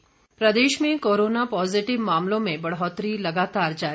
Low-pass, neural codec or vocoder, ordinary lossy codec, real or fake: none; none; none; real